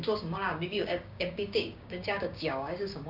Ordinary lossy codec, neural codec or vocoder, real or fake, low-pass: none; codec, 16 kHz, 6 kbps, DAC; fake; 5.4 kHz